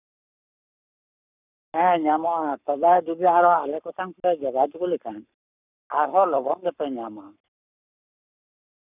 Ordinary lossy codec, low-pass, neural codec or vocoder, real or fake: none; 3.6 kHz; codec, 44.1 kHz, 7.8 kbps, Pupu-Codec; fake